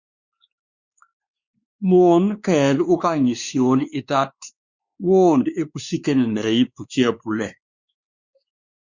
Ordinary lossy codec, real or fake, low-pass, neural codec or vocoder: Opus, 64 kbps; fake; 7.2 kHz; codec, 16 kHz, 2 kbps, X-Codec, WavLM features, trained on Multilingual LibriSpeech